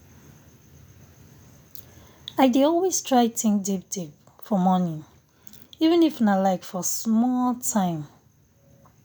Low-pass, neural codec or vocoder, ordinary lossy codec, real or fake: none; none; none; real